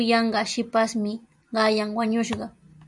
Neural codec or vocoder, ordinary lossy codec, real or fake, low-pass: none; MP3, 96 kbps; real; 10.8 kHz